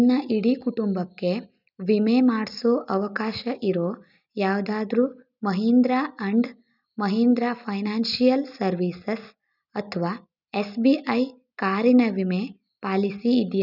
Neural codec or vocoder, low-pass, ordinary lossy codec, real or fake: none; 5.4 kHz; none; real